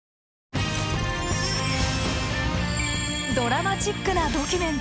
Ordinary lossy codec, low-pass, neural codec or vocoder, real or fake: none; none; none; real